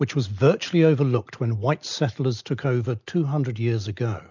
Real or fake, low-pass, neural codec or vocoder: real; 7.2 kHz; none